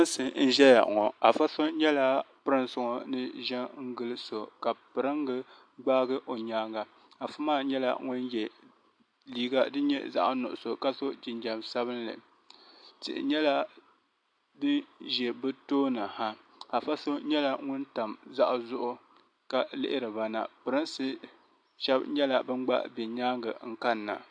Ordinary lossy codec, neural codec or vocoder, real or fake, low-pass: AAC, 64 kbps; none; real; 9.9 kHz